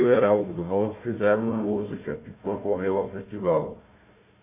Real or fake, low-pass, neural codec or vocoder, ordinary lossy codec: fake; 3.6 kHz; codec, 16 kHz, 1 kbps, FunCodec, trained on Chinese and English, 50 frames a second; MP3, 24 kbps